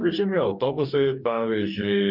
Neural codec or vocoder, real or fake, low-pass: codec, 44.1 kHz, 2.6 kbps, DAC; fake; 5.4 kHz